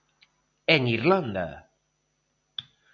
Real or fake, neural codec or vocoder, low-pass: real; none; 7.2 kHz